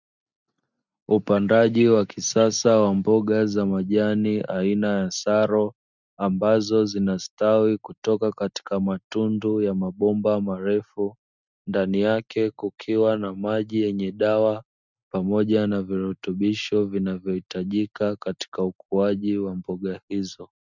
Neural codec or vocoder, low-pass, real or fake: none; 7.2 kHz; real